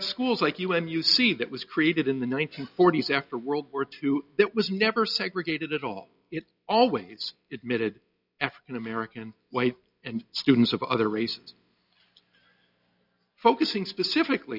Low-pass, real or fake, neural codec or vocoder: 5.4 kHz; real; none